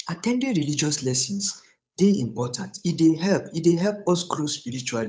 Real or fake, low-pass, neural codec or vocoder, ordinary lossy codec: fake; none; codec, 16 kHz, 8 kbps, FunCodec, trained on Chinese and English, 25 frames a second; none